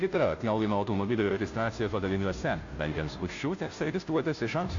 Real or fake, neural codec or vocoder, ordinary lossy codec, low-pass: fake; codec, 16 kHz, 0.5 kbps, FunCodec, trained on Chinese and English, 25 frames a second; AAC, 48 kbps; 7.2 kHz